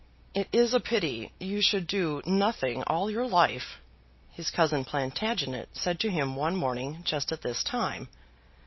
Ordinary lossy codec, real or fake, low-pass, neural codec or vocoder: MP3, 24 kbps; real; 7.2 kHz; none